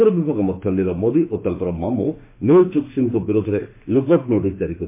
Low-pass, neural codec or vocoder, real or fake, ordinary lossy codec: 3.6 kHz; autoencoder, 48 kHz, 32 numbers a frame, DAC-VAE, trained on Japanese speech; fake; MP3, 24 kbps